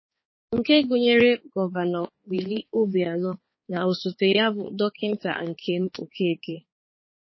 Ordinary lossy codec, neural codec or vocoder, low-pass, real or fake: MP3, 24 kbps; codec, 16 kHz, 4 kbps, X-Codec, HuBERT features, trained on balanced general audio; 7.2 kHz; fake